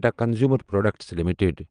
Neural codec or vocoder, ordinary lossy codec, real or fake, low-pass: vocoder, 22.05 kHz, 80 mel bands, Vocos; Opus, 32 kbps; fake; 9.9 kHz